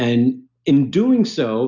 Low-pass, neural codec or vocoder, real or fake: 7.2 kHz; none; real